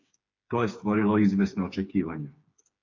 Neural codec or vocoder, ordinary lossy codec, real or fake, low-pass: codec, 16 kHz, 4 kbps, FreqCodec, smaller model; AAC, 48 kbps; fake; 7.2 kHz